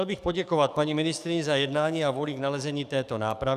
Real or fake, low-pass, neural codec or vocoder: fake; 14.4 kHz; codec, 44.1 kHz, 7.8 kbps, DAC